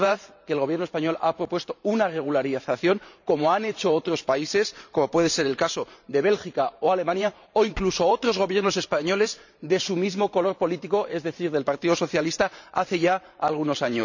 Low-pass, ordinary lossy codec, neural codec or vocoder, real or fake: 7.2 kHz; none; vocoder, 44.1 kHz, 128 mel bands every 512 samples, BigVGAN v2; fake